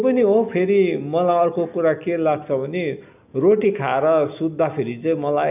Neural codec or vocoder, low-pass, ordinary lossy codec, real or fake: none; 3.6 kHz; none; real